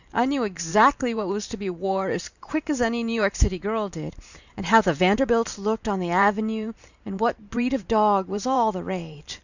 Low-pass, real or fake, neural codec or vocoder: 7.2 kHz; fake; vocoder, 44.1 kHz, 128 mel bands every 512 samples, BigVGAN v2